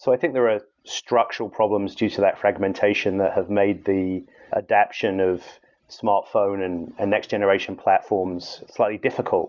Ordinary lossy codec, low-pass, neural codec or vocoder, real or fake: Opus, 64 kbps; 7.2 kHz; none; real